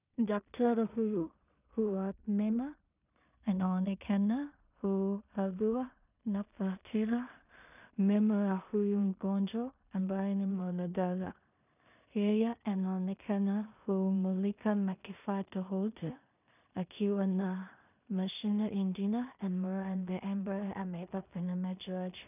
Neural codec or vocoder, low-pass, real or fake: codec, 16 kHz in and 24 kHz out, 0.4 kbps, LongCat-Audio-Codec, two codebook decoder; 3.6 kHz; fake